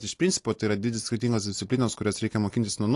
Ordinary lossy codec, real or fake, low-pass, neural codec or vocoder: AAC, 48 kbps; real; 10.8 kHz; none